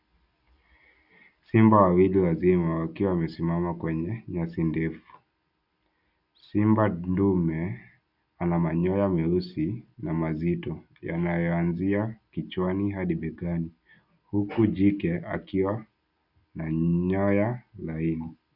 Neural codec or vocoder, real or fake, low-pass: none; real; 5.4 kHz